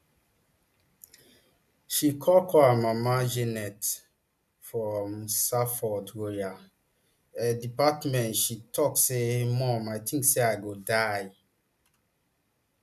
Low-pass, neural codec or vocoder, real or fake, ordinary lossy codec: 14.4 kHz; none; real; none